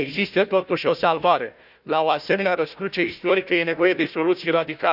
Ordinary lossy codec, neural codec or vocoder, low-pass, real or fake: none; codec, 16 kHz, 1 kbps, FunCodec, trained on Chinese and English, 50 frames a second; 5.4 kHz; fake